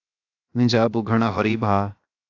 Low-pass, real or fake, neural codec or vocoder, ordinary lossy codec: 7.2 kHz; fake; codec, 16 kHz, 0.7 kbps, FocalCodec; AAC, 48 kbps